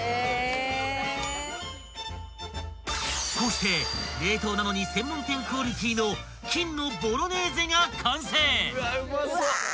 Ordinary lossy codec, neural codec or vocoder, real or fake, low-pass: none; none; real; none